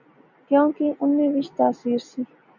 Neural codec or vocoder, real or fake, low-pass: none; real; 7.2 kHz